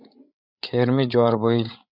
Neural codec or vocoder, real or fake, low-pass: codec, 16 kHz, 8 kbps, FreqCodec, larger model; fake; 5.4 kHz